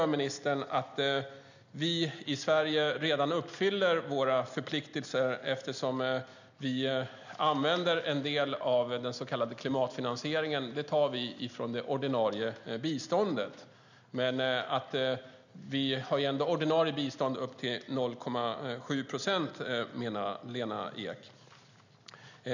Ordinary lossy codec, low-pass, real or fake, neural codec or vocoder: none; 7.2 kHz; real; none